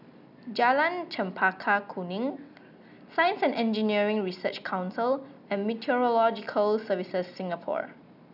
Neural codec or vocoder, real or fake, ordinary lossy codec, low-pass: none; real; none; 5.4 kHz